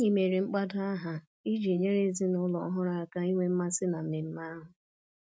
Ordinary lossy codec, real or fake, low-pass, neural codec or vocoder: none; real; none; none